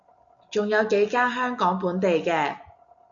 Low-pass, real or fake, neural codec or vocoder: 7.2 kHz; real; none